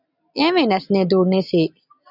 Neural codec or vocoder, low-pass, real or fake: none; 5.4 kHz; real